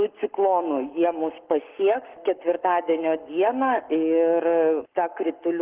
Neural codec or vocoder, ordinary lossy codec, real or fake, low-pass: codec, 44.1 kHz, 7.8 kbps, Pupu-Codec; Opus, 16 kbps; fake; 3.6 kHz